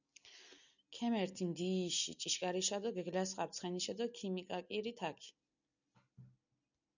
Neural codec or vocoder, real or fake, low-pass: none; real; 7.2 kHz